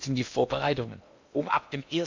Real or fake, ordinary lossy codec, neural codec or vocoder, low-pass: fake; MP3, 64 kbps; codec, 16 kHz in and 24 kHz out, 0.8 kbps, FocalCodec, streaming, 65536 codes; 7.2 kHz